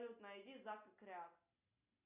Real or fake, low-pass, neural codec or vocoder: real; 3.6 kHz; none